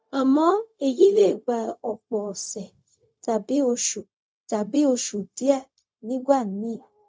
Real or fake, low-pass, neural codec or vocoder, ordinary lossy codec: fake; none; codec, 16 kHz, 0.4 kbps, LongCat-Audio-Codec; none